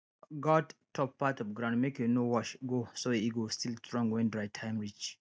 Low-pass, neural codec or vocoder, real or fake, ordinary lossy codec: none; none; real; none